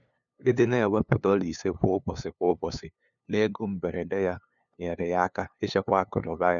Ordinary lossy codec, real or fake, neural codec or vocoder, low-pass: none; fake; codec, 16 kHz, 2 kbps, FunCodec, trained on LibriTTS, 25 frames a second; 7.2 kHz